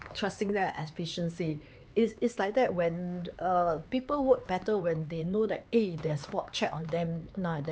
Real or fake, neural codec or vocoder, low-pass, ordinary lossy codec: fake; codec, 16 kHz, 4 kbps, X-Codec, HuBERT features, trained on LibriSpeech; none; none